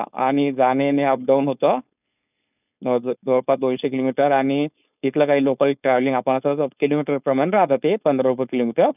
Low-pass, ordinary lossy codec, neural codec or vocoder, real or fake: 3.6 kHz; none; codec, 16 kHz, 4.8 kbps, FACodec; fake